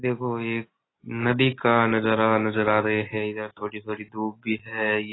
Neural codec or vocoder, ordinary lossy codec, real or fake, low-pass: none; AAC, 16 kbps; real; 7.2 kHz